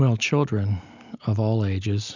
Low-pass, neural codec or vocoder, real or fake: 7.2 kHz; none; real